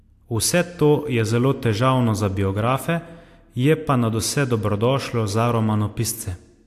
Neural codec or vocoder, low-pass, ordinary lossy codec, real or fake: none; 14.4 kHz; AAC, 64 kbps; real